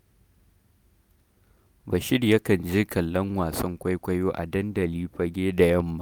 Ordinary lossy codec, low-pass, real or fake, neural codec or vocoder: none; none; real; none